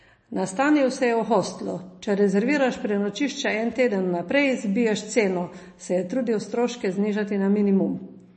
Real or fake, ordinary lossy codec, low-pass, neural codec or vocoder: real; MP3, 32 kbps; 10.8 kHz; none